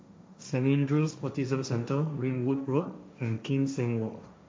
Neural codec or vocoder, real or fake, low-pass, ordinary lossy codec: codec, 16 kHz, 1.1 kbps, Voila-Tokenizer; fake; none; none